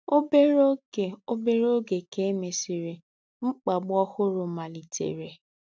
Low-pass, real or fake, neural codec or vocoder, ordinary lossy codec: none; real; none; none